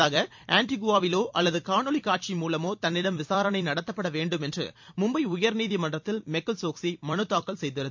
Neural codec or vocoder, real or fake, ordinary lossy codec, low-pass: vocoder, 44.1 kHz, 128 mel bands every 256 samples, BigVGAN v2; fake; MP3, 48 kbps; 7.2 kHz